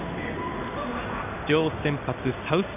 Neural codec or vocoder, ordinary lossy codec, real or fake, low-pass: codec, 16 kHz in and 24 kHz out, 1 kbps, XY-Tokenizer; none; fake; 3.6 kHz